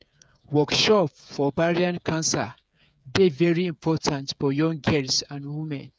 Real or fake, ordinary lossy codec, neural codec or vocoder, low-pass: fake; none; codec, 16 kHz, 8 kbps, FreqCodec, smaller model; none